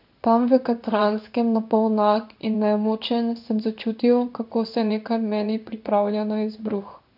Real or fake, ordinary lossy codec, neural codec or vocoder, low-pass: fake; none; codec, 16 kHz in and 24 kHz out, 1 kbps, XY-Tokenizer; 5.4 kHz